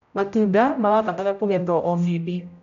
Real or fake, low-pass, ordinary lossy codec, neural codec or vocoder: fake; 7.2 kHz; none; codec, 16 kHz, 0.5 kbps, X-Codec, HuBERT features, trained on general audio